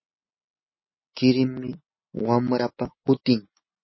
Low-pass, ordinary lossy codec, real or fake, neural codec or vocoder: 7.2 kHz; MP3, 24 kbps; real; none